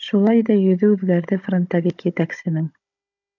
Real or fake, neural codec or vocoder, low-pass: fake; codec, 16 kHz, 16 kbps, FunCodec, trained on Chinese and English, 50 frames a second; 7.2 kHz